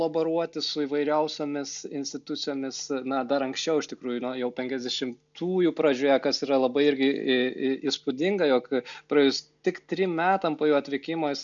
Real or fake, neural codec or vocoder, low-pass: real; none; 7.2 kHz